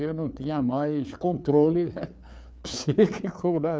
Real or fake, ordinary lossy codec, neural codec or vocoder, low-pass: fake; none; codec, 16 kHz, 4 kbps, FreqCodec, larger model; none